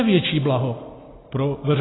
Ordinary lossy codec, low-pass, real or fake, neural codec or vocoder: AAC, 16 kbps; 7.2 kHz; real; none